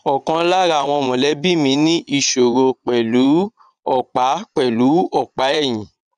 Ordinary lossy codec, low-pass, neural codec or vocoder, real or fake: none; 9.9 kHz; vocoder, 22.05 kHz, 80 mel bands, Vocos; fake